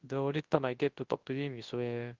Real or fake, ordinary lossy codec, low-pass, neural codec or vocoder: fake; Opus, 32 kbps; 7.2 kHz; codec, 24 kHz, 0.9 kbps, WavTokenizer, large speech release